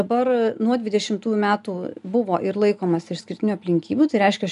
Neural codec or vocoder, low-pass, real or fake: none; 10.8 kHz; real